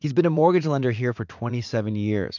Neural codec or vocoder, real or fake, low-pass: vocoder, 44.1 kHz, 80 mel bands, Vocos; fake; 7.2 kHz